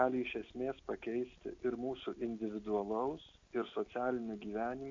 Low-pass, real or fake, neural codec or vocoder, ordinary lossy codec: 7.2 kHz; real; none; AAC, 48 kbps